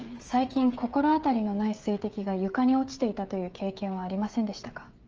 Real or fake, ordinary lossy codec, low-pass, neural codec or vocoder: fake; Opus, 24 kbps; 7.2 kHz; codec, 16 kHz, 16 kbps, FreqCodec, smaller model